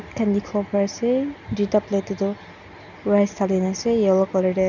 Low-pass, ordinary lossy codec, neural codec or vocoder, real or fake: 7.2 kHz; none; none; real